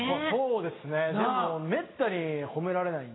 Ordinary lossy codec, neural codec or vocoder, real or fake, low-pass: AAC, 16 kbps; none; real; 7.2 kHz